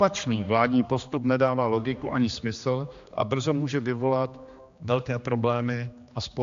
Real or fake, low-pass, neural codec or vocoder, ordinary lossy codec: fake; 7.2 kHz; codec, 16 kHz, 2 kbps, X-Codec, HuBERT features, trained on general audio; AAC, 64 kbps